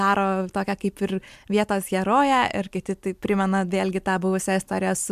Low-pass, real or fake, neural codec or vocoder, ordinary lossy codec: 14.4 kHz; real; none; MP3, 96 kbps